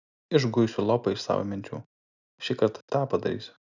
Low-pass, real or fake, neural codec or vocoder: 7.2 kHz; real; none